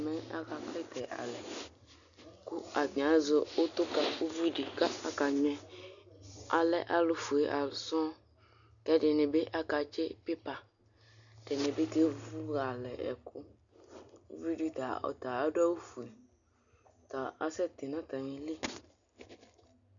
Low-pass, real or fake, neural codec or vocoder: 7.2 kHz; real; none